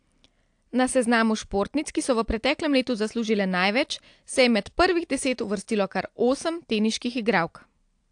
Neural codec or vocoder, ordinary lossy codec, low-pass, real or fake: none; AAC, 64 kbps; 9.9 kHz; real